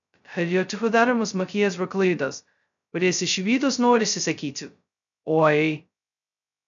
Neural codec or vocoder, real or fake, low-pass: codec, 16 kHz, 0.2 kbps, FocalCodec; fake; 7.2 kHz